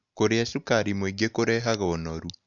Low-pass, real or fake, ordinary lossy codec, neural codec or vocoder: 7.2 kHz; real; none; none